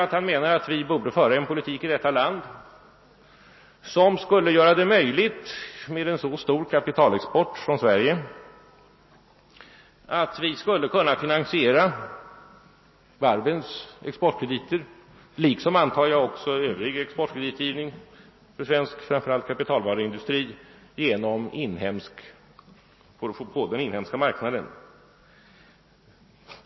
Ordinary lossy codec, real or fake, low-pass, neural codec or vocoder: MP3, 24 kbps; real; 7.2 kHz; none